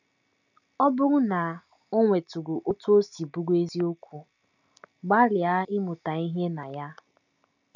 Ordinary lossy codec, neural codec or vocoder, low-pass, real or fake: none; none; 7.2 kHz; real